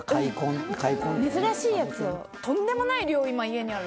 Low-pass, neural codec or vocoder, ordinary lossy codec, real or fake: none; none; none; real